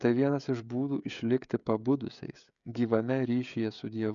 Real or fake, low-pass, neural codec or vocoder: fake; 7.2 kHz; codec, 16 kHz, 16 kbps, FreqCodec, smaller model